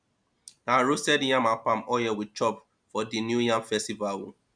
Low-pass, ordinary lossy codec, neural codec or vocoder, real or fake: 9.9 kHz; none; none; real